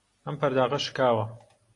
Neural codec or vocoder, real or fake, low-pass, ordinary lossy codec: none; real; 10.8 kHz; AAC, 48 kbps